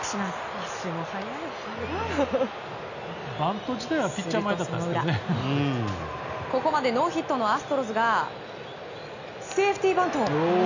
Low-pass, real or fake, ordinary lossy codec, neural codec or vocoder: 7.2 kHz; real; none; none